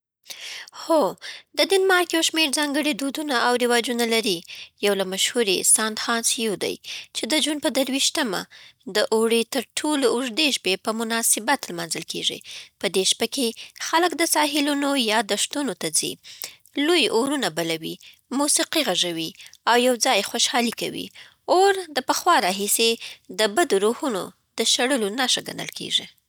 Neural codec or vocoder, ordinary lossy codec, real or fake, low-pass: none; none; real; none